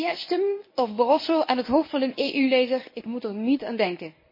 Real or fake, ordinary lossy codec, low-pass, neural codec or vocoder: fake; MP3, 24 kbps; 5.4 kHz; codec, 24 kHz, 0.9 kbps, WavTokenizer, medium speech release version 1